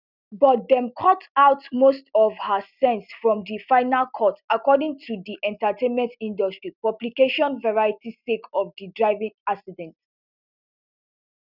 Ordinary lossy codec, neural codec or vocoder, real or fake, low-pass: none; none; real; 5.4 kHz